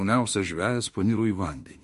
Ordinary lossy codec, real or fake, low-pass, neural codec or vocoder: MP3, 48 kbps; fake; 14.4 kHz; autoencoder, 48 kHz, 32 numbers a frame, DAC-VAE, trained on Japanese speech